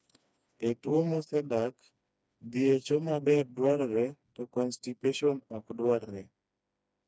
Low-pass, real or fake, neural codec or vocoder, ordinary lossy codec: none; fake; codec, 16 kHz, 2 kbps, FreqCodec, smaller model; none